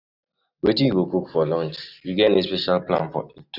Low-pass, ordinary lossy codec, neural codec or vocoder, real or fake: 5.4 kHz; none; none; real